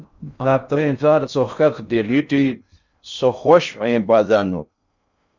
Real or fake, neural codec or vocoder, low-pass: fake; codec, 16 kHz in and 24 kHz out, 0.6 kbps, FocalCodec, streaming, 2048 codes; 7.2 kHz